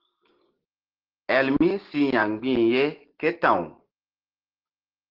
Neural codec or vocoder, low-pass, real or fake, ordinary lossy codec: none; 5.4 kHz; real; Opus, 16 kbps